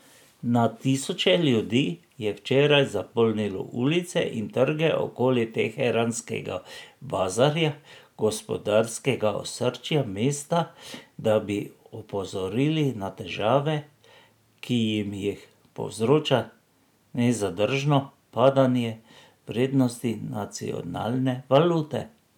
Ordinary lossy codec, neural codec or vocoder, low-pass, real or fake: none; none; 19.8 kHz; real